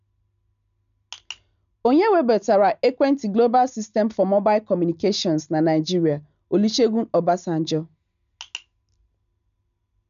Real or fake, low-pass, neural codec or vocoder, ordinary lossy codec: real; 7.2 kHz; none; none